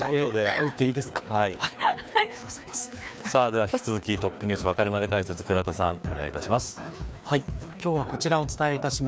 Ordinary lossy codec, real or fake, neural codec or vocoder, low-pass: none; fake; codec, 16 kHz, 2 kbps, FreqCodec, larger model; none